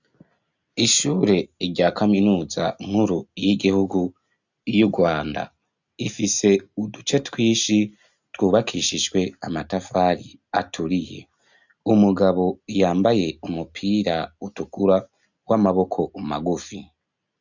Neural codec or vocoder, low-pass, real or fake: none; 7.2 kHz; real